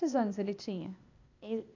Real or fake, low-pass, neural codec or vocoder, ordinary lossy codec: fake; 7.2 kHz; codec, 16 kHz, 0.8 kbps, ZipCodec; none